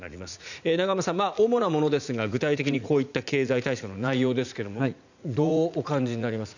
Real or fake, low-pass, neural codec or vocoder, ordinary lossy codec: fake; 7.2 kHz; vocoder, 44.1 kHz, 80 mel bands, Vocos; none